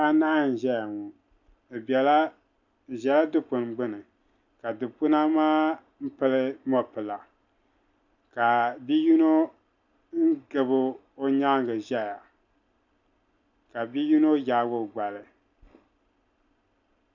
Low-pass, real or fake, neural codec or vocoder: 7.2 kHz; real; none